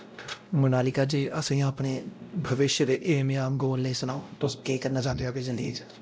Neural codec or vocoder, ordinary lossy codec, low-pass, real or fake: codec, 16 kHz, 0.5 kbps, X-Codec, WavLM features, trained on Multilingual LibriSpeech; none; none; fake